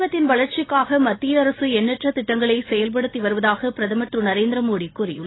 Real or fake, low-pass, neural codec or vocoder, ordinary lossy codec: real; 7.2 kHz; none; AAC, 16 kbps